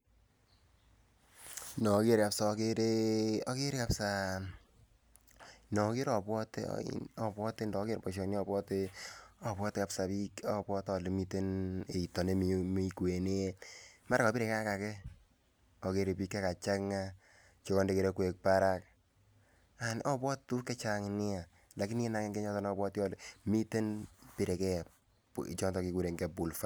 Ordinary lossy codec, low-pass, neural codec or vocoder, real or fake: none; none; none; real